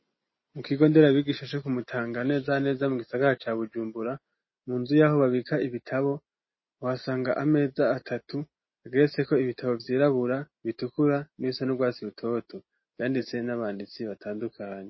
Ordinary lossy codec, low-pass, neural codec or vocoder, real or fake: MP3, 24 kbps; 7.2 kHz; none; real